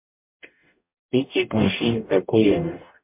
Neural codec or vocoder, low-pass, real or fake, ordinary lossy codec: codec, 44.1 kHz, 0.9 kbps, DAC; 3.6 kHz; fake; MP3, 32 kbps